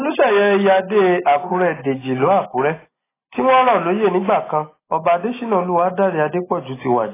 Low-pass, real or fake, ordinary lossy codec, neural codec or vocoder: 3.6 kHz; real; AAC, 16 kbps; none